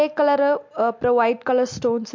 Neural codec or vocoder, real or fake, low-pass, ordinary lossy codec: none; real; 7.2 kHz; MP3, 48 kbps